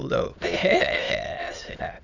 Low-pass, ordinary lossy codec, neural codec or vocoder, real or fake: 7.2 kHz; none; autoencoder, 22.05 kHz, a latent of 192 numbers a frame, VITS, trained on many speakers; fake